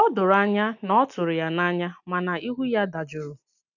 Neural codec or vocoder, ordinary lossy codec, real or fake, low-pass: none; none; real; 7.2 kHz